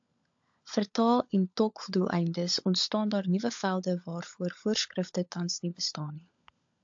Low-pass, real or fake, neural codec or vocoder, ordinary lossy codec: 7.2 kHz; fake; codec, 16 kHz, 16 kbps, FunCodec, trained on LibriTTS, 50 frames a second; AAC, 64 kbps